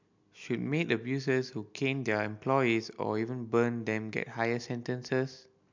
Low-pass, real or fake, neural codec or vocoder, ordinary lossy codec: 7.2 kHz; real; none; MP3, 64 kbps